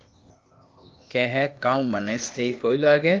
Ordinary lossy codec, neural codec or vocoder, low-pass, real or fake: Opus, 32 kbps; codec, 16 kHz, 0.8 kbps, ZipCodec; 7.2 kHz; fake